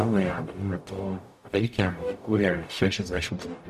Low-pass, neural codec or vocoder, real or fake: 14.4 kHz; codec, 44.1 kHz, 0.9 kbps, DAC; fake